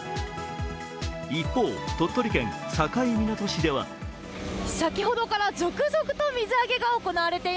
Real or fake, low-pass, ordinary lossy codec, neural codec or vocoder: real; none; none; none